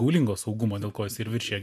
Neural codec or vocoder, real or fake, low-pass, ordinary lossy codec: none; real; 14.4 kHz; MP3, 96 kbps